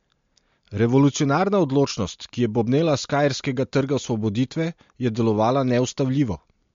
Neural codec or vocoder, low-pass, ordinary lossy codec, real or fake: none; 7.2 kHz; MP3, 48 kbps; real